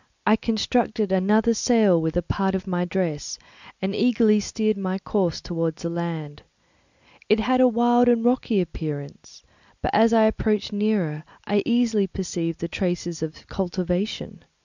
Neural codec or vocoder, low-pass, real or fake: none; 7.2 kHz; real